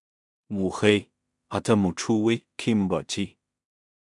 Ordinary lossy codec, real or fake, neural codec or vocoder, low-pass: MP3, 96 kbps; fake; codec, 16 kHz in and 24 kHz out, 0.4 kbps, LongCat-Audio-Codec, two codebook decoder; 10.8 kHz